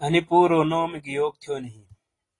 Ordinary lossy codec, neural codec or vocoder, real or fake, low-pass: AAC, 32 kbps; none; real; 10.8 kHz